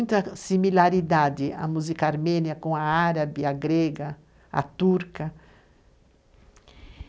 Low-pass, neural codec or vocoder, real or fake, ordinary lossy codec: none; none; real; none